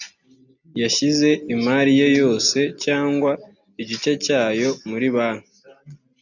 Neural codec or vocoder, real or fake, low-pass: none; real; 7.2 kHz